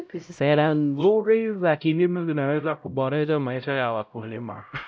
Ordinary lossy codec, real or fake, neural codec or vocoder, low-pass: none; fake; codec, 16 kHz, 0.5 kbps, X-Codec, HuBERT features, trained on LibriSpeech; none